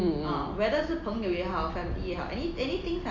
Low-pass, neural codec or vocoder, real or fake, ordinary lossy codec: 7.2 kHz; none; real; MP3, 48 kbps